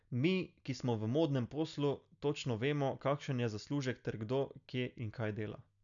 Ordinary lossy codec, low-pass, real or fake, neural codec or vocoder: none; 7.2 kHz; real; none